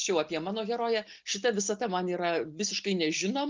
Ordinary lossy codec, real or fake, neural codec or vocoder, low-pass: Opus, 32 kbps; real; none; 7.2 kHz